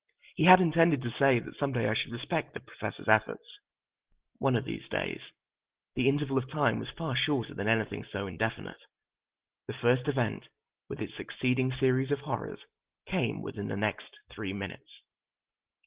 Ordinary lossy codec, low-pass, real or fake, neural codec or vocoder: Opus, 16 kbps; 3.6 kHz; real; none